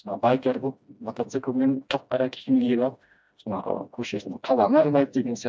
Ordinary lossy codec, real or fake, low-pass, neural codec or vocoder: none; fake; none; codec, 16 kHz, 1 kbps, FreqCodec, smaller model